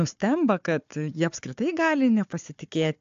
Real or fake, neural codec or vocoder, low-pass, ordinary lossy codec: fake; codec, 16 kHz, 4 kbps, FunCodec, trained on Chinese and English, 50 frames a second; 7.2 kHz; AAC, 64 kbps